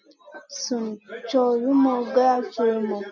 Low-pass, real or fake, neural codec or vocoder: 7.2 kHz; real; none